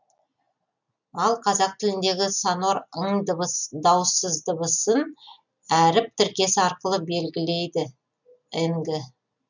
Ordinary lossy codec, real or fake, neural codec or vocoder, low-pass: none; real; none; 7.2 kHz